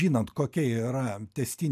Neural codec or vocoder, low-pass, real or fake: none; 14.4 kHz; real